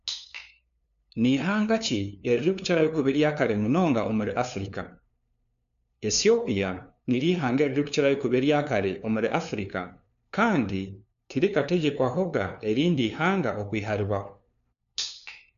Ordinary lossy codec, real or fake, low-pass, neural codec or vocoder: none; fake; 7.2 kHz; codec, 16 kHz, 2 kbps, FunCodec, trained on LibriTTS, 25 frames a second